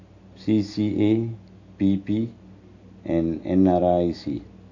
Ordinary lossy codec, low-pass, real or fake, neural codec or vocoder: AAC, 48 kbps; 7.2 kHz; real; none